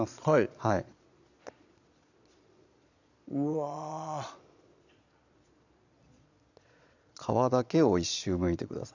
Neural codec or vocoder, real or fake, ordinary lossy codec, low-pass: vocoder, 22.05 kHz, 80 mel bands, Vocos; fake; none; 7.2 kHz